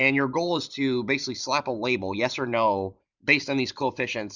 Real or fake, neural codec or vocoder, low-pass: fake; vocoder, 44.1 kHz, 128 mel bands every 512 samples, BigVGAN v2; 7.2 kHz